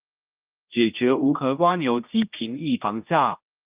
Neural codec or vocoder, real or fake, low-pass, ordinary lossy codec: codec, 16 kHz, 1 kbps, X-Codec, HuBERT features, trained on general audio; fake; 3.6 kHz; Opus, 24 kbps